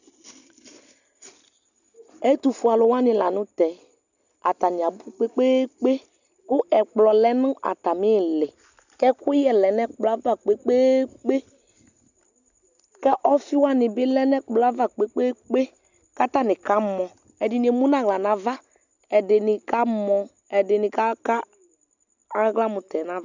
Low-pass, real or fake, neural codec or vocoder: 7.2 kHz; real; none